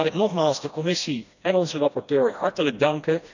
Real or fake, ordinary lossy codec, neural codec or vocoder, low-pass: fake; none; codec, 16 kHz, 1 kbps, FreqCodec, smaller model; 7.2 kHz